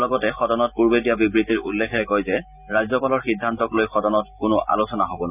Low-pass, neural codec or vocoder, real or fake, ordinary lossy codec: 3.6 kHz; none; real; none